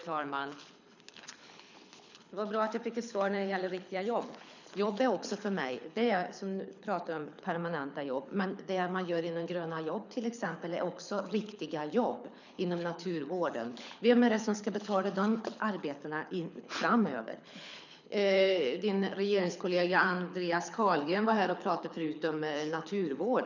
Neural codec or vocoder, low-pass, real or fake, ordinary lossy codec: codec, 24 kHz, 6 kbps, HILCodec; 7.2 kHz; fake; none